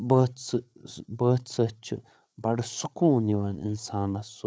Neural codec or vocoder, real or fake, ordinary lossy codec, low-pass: codec, 16 kHz, 8 kbps, FreqCodec, larger model; fake; none; none